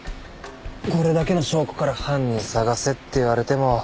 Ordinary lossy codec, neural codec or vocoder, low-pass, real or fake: none; none; none; real